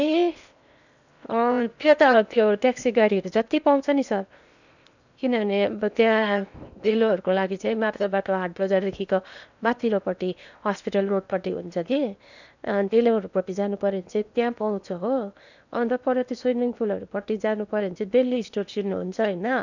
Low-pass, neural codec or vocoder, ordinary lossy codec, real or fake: 7.2 kHz; codec, 16 kHz in and 24 kHz out, 0.8 kbps, FocalCodec, streaming, 65536 codes; none; fake